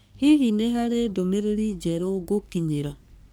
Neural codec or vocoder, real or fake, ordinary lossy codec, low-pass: codec, 44.1 kHz, 3.4 kbps, Pupu-Codec; fake; none; none